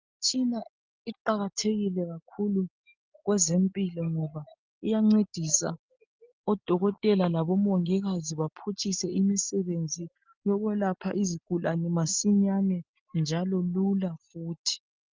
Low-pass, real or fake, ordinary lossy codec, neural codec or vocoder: 7.2 kHz; real; Opus, 24 kbps; none